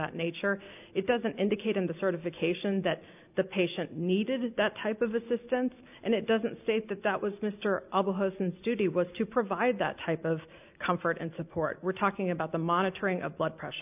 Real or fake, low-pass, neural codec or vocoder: real; 3.6 kHz; none